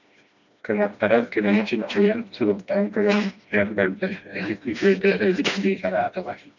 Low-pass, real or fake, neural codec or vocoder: 7.2 kHz; fake; codec, 16 kHz, 1 kbps, FreqCodec, smaller model